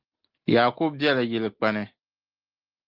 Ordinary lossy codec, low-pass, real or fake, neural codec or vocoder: Opus, 24 kbps; 5.4 kHz; real; none